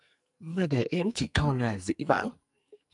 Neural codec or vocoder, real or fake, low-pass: codec, 44.1 kHz, 2.6 kbps, SNAC; fake; 10.8 kHz